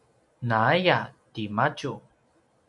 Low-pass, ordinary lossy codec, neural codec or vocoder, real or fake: 10.8 kHz; MP3, 96 kbps; none; real